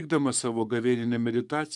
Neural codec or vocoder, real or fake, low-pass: codec, 44.1 kHz, 7.8 kbps, DAC; fake; 10.8 kHz